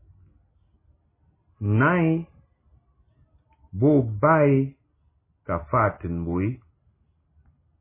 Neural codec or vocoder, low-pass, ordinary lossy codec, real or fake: none; 3.6 kHz; MP3, 16 kbps; real